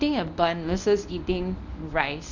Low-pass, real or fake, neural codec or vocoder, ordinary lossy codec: 7.2 kHz; fake; codec, 24 kHz, 0.9 kbps, WavTokenizer, medium speech release version 1; none